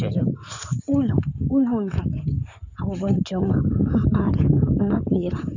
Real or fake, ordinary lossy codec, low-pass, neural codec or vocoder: fake; none; 7.2 kHz; codec, 16 kHz in and 24 kHz out, 2.2 kbps, FireRedTTS-2 codec